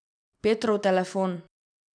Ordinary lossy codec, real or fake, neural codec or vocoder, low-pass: none; real; none; 9.9 kHz